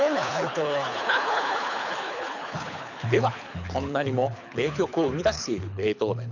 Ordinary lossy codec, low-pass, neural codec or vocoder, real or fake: none; 7.2 kHz; codec, 24 kHz, 6 kbps, HILCodec; fake